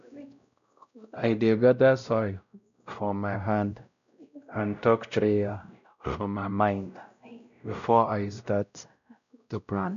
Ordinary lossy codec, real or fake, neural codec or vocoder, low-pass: none; fake; codec, 16 kHz, 0.5 kbps, X-Codec, WavLM features, trained on Multilingual LibriSpeech; 7.2 kHz